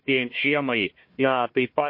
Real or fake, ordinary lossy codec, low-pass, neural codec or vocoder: fake; MP3, 32 kbps; 5.4 kHz; codec, 16 kHz, 1 kbps, FunCodec, trained on Chinese and English, 50 frames a second